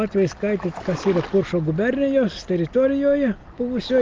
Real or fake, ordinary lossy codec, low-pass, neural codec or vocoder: real; Opus, 24 kbps; 7.2 kHz; none